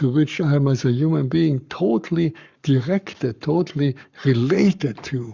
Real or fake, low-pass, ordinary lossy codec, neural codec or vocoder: fake; 7.2 kHz; Opus, 64 kbps; codec, 44.1 kHz, 7.8 kbps, Pupu-Codec